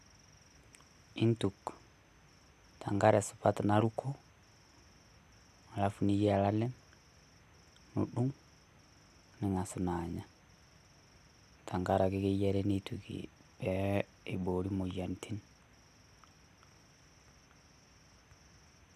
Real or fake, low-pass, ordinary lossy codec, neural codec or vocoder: real; 14.4 kHz; none; none